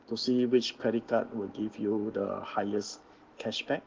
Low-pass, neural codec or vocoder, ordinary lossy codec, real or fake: 7.2 kHz; none; Opus, 16 kbps; real